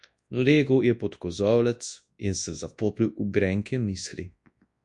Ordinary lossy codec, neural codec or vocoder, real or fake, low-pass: MP3, 64 kbps; codec, 24 kHz, 0.9 kbps, WavTokenizer, large speech release; fake; 10.8 kHz